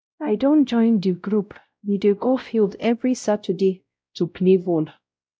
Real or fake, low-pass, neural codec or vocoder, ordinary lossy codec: fake; none; codec, 16 kHz, 0.5 kbps, X-Codec, WavLM features, trained on Multilingual LibriSpeech; none